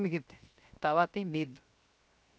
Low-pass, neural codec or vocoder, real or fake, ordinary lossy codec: none; codec, 16 kHz, 0.7 kbps, FocalCodec; fake; none